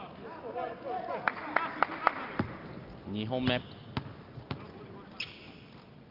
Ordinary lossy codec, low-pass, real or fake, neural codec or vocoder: Opus, 24 kbps; 5.4 kHz; real; none